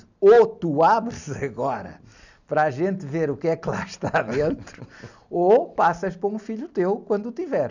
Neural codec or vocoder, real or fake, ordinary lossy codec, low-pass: none; real; none; 7.2 kHz